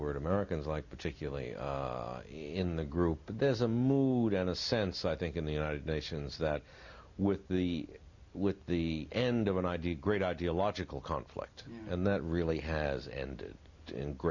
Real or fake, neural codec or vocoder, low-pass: real; none; 7.2 kHz